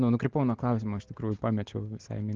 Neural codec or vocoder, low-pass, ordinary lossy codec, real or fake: none; 7.2 kHz; Opus, 16 kbps; real